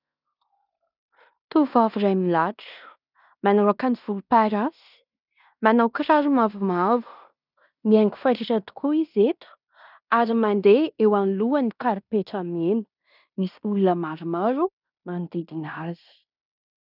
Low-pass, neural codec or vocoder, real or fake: 5.4 kHz; codec, 16 kHz in and 24 kHz out, 0.9 kbps, LongCat-Audio-Codec, fine tuned four codebook decoder; fake